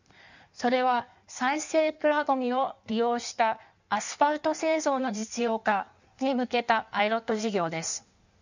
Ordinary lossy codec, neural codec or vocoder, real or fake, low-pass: none; codec, 16 kHz in and 24 kHz out, 1.1 kbps, FireRedTTS-2 codec; fake; 7.2 kHz